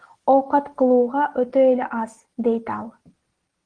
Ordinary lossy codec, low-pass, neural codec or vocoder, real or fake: Opus, 16 kbps; 9.9 kHz; none; real